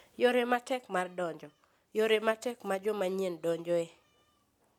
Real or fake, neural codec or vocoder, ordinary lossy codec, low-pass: real; none; none; 19.8 kHz